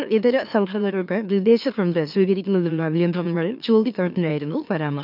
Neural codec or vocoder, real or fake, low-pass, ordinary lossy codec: autoencoder, 44.1 kHz, a latent of 192 numbers a frame, MeloTTS; fake; 5.4 kHz; none